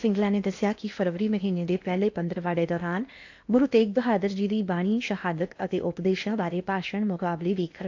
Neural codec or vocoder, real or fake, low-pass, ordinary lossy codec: codec, 16 kHz in and 24 kHz out, 0.8 kbps, FocalCodec, streaming, 65536 codes; fake; 7.2 kHz; AAC, 48 kbps